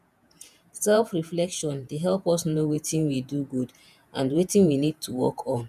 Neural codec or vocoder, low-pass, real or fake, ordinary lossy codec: vocoder, 48 kHz, 128 mel bands, Vocos; 14.4 kHz; fake; none